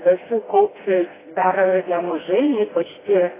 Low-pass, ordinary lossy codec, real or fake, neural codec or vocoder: 3.6 kHz; AAC, 16 kbps; fake; codec, 16 kHz, 1 kbps, FreqCodec, smaller model